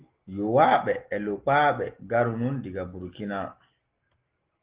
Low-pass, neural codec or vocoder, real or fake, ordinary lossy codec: 3.6 kHz; none; real; Opus, 16 kbps